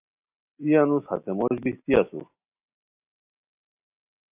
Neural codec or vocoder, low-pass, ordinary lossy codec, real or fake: none; 3.6 kHz; AAC, 32 kbps; real